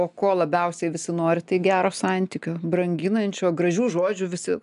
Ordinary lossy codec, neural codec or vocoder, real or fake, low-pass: MP3, 96 kbps; none; real; 10.8 kHz